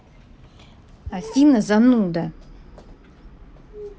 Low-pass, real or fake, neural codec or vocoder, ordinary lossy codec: none; real; none; none